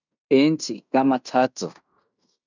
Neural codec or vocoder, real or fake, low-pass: codec, 16 kHz in and 24 kHz out, 0.9 kbps, LongCat-Audio-Codec, fine tuned four codebook decoder; fake; 7.2 kHz